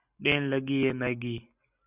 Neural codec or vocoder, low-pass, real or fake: none; 3.6 kHz; real